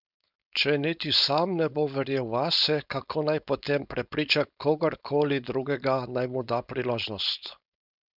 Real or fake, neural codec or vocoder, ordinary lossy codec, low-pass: fake; codec, 16 kHz, 4.8 kbps, FACodec; none; 5.4 kHz